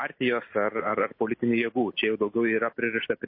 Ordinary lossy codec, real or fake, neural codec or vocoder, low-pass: MP3, 24 kbps; real; none; 5.4 kHz